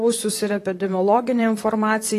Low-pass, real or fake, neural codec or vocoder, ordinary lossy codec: 14.4 kHz; fake; vocoder, 44.1 kHz, 128 mel bands, Pupu-Vocoder; AAC, 48 kbps